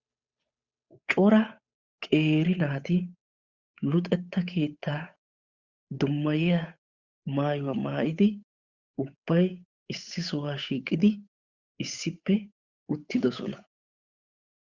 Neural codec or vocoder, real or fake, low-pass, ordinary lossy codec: codec, 16 kHz, 8 kbps, FunCodec, trained on Chinese and English, 25 frames a second; fake; 7.2 kHz; Opus, 64 kbps